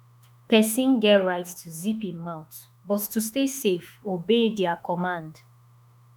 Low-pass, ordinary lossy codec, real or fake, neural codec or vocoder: none; none; fake; autoencoder, 48 kHz, 32 numbers a frame, DAC-VAE, trained on Japanese speech